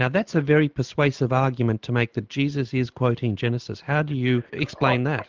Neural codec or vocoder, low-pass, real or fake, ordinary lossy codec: none; 7.2 kHz; real; Opus, 32 kbps